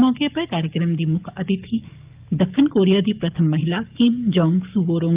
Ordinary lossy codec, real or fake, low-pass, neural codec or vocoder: Opus, 24 kbps; fake; 3.6 kHz; codec, 44.1 kHz, 7.8 kbps, DAC